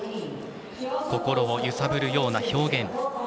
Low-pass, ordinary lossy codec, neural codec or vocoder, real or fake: none; none; none; real